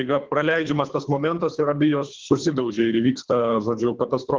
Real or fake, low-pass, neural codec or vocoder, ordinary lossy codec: fake; 7.2 kHz; codec, 24 kHz, 3 kbps, HILCodec; Opus, 16 kbps